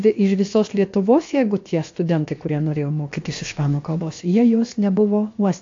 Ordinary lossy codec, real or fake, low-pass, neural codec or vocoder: AAC, 48 kbps; fake; 7.2 kHz; codec, 16 kHz, about 1 kbps, DyCAST, with the encoder's durations